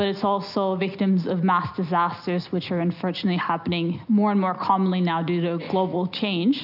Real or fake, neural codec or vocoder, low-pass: real; none; 5.4 kHz